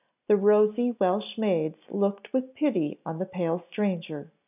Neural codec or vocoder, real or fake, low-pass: none; real; 3.6 kHz